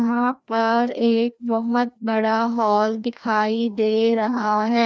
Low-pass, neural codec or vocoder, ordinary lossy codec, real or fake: none; codec, 16 kHz, 1 kbps, FreqCodec, larger model; none; fake